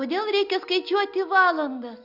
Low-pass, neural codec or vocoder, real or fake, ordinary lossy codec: 5.4 kHz; none; real; Opus, 64 kbps